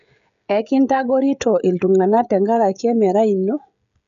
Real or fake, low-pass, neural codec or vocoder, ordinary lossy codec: fake; 7.2 kHz; codec, 16 kHz, 16 kbps, FreqCodec, smaller model; none